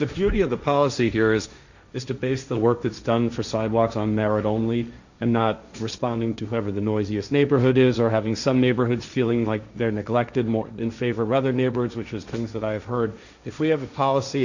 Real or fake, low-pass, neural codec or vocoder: fake; 7.2 kHz; codec, 16 kHz, 1.1 kbps, Voila-Tokenizer